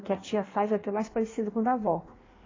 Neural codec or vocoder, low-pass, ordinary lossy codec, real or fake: codec, 16 kHz in and 24 kHz out, 1.1 kbps, FireRedTTS-2 codec; 7.2 kHz; AAC, 32 kbps; fake